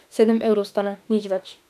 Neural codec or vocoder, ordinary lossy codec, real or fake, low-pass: autoencoder, 48 kHz, 32 numbers a frame, DAC-VAE, trained on Japanese speech; none; fake; 14.4 kHz